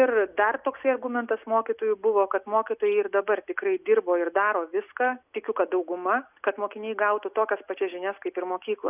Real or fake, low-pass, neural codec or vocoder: real; 3.6 kHz; none